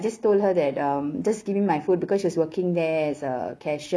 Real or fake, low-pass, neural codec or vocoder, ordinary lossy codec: real; none; none; none